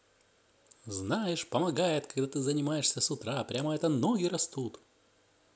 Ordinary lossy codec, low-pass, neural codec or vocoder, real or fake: none; none; none; real